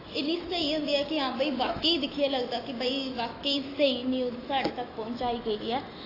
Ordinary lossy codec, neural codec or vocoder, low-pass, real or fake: AAC, 24 kbps; autoencoder, 48 kHz, 128 numbers a frame, DAC-VAE, trained on Japanese speech; 5.4 kHz; fake